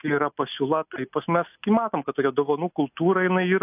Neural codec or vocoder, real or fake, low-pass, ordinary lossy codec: none; real; 3.6 kHz; AAC, 32 kbps